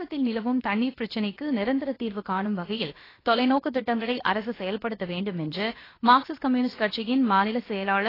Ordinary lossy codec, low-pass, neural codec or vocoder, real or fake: AAC, 24 kbps; 5.4 kHz; codec, 16 kHz, about 1 kbps, DyCAST, with the encoder's durations; fake